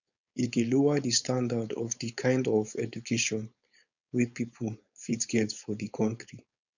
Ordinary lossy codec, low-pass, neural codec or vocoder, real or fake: none; 7.2 kHz; codec, 16 kHz, 4.8 kbps, FACodec; fake